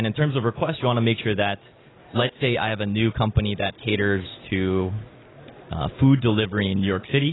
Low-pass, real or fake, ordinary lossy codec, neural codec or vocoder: 7.2 kHz; real; AAC, 16 kbps; none